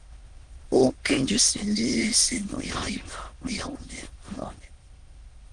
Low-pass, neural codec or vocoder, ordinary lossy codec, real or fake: 9.9 kHz; autoencoder, 22.05 kHz, a latent of 192 numbers a frame, VITS, trained on many speakers; Opus, 24 kbps; fake